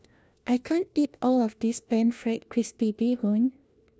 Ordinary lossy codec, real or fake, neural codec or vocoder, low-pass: none; fake; codec, 16 kHz, 1 kbps, FunCodec, trained on LibriTTS, 50 frames a second; none